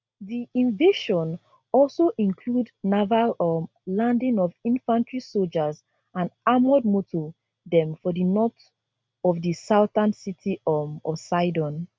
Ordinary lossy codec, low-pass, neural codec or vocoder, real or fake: none; none; none; real